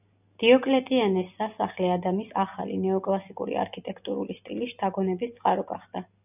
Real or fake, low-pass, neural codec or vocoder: real; 3.6 kHz; none